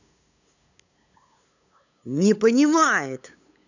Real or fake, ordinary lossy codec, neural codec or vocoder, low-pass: fake; none; codec, 16 kHz, 8 kbps, FunCodec, trained on LibriTTS, 25 frames a second; 7.2 kHz